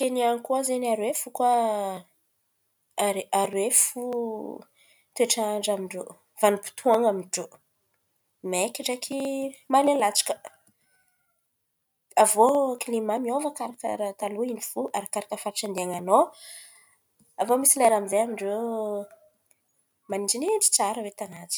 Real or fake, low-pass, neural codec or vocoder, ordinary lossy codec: real; none; none; none